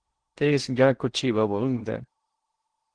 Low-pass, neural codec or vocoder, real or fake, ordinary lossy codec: 9.9 kHz; codec, 16 kHz in and 24 kHz out, 0.8 kbps, FocalCodec, streaming, 65536 codes; fake; Opus, 16 kbps